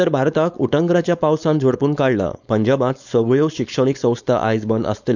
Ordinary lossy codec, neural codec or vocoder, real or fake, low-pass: none; codec, 16 kHz, 4.8 kbps, FACodec; fake; 7.2 kHz